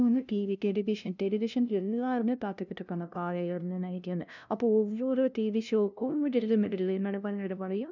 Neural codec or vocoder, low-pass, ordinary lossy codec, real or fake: codec, 16 kHz, 0.5 kbps, FunCodec, trained on LibriTTS, 25 frames a second; 7.2 kHz; none; fake